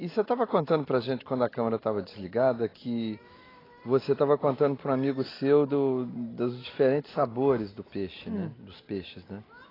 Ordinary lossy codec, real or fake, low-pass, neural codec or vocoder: AAC, 24 kbps; real; 5.4 kHz; none